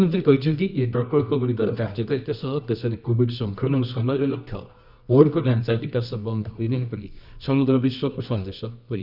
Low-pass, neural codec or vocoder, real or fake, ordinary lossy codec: 5.4 kHz; codec, 24 kHz, 0.9 kbps, WavTokenizer, medium music audio release; fake; none